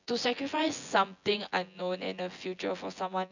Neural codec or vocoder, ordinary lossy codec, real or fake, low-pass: vocoder, 24 kHz, 100 mel bands, Vocos; none; fake; 7.2 kHz